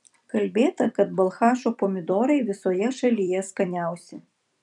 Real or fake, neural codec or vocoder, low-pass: fake; vocoder, 44.1 kHz, 128 mel bands every 256 samples, BigVGAN v2; 10.8 kHz